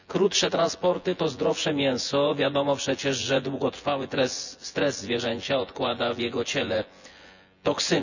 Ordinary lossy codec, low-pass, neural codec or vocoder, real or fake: none; 7.2 kHz; vocoder, 24 kHz, 100 mel bands, Vocos; fake